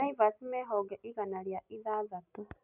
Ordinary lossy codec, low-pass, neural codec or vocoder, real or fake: none; 3.6 kHz; none; real